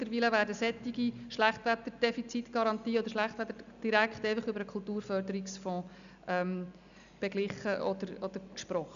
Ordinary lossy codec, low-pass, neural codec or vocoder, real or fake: none; 7.2 kHz; none; real